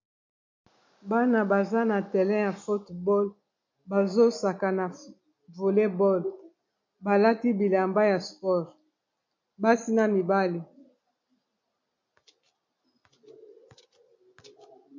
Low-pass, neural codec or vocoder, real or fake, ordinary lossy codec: 7.2 kHz; none; real; MP3, 48 kbps